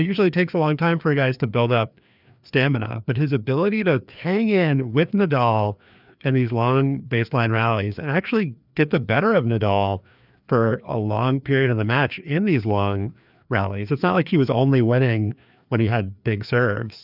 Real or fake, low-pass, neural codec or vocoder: fake; 5.4 kHz; codec, 16 kHz, 2 kbps, FreqCodec, larger model